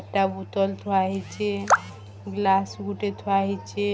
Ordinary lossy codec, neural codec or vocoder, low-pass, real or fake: none; none; none; real